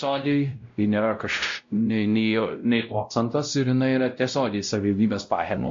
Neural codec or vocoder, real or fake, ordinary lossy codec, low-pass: codec, 16 kHz, 0.5 kbps, X-Codec, WavLM features, trained on Multilingual LibriSpeech; fake; MP3, 48 kbps; 7.2 kHz